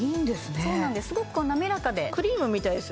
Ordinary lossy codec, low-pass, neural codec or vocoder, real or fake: none; none; none; real